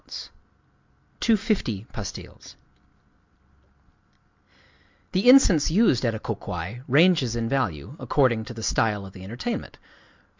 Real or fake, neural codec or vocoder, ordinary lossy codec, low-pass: real; none; MP3, 64 kbps; 7.2 kHz